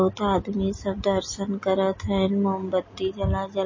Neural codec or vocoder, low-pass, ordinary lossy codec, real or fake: none; 7.2 kHz; MP3, 32 kbps; real